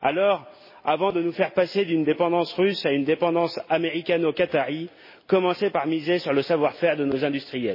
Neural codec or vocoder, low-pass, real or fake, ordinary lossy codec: none; 5.4 kHz; real; MP3, 24 kbps